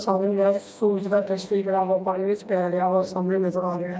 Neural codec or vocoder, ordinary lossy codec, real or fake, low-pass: codec, 16 kHz, 1 kbps, FreqCodec, smaller model; none; fake; none